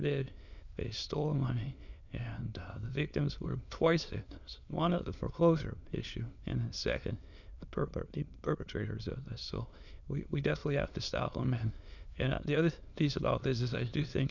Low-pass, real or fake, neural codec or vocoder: 7.2 kHz; fake; autoencoder, 22.05 kHz, a latent of 192 numbers a frame, VITS, trained on many speakers